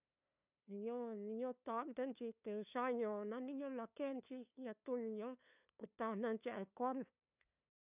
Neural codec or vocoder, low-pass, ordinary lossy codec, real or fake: codec, 16 kHz, 2 kbps, FunCodec, trained on LibriTTS, 25 frames a second; 3.6 kHz; none; fake